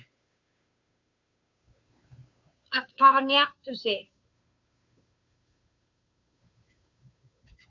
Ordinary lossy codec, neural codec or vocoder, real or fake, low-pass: MP3, 48 kbps; codec, 16 kHz, 2 kbps, FunCodec, trained on Chinese and English, 25 frames a second; fake; 7.2 kHz